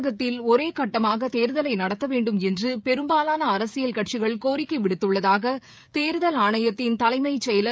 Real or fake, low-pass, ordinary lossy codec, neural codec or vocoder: fake; none; none; codec, 16 kHz, 16 kbps, FreqCodec, smaller model